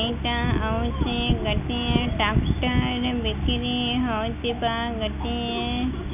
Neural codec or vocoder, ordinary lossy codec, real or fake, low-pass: none; none; real; 3.6 kHz